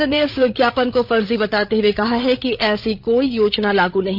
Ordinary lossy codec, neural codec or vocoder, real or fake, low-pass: none; codec, 16 kHz, 8 kbps, FreqCodec, larger model; fake; 5.4 kHz